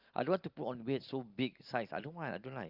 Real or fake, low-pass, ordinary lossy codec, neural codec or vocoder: real; 5.4 kHz; Opus, 32 kbps; none